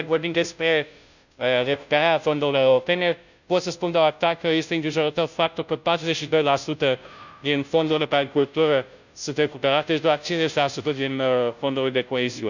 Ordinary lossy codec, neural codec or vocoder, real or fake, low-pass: none; codec, 16 kHz, 0.5 kbps, FunCodec, trained on Chinese and English, 25 frames a second; fake; 7.2 kHz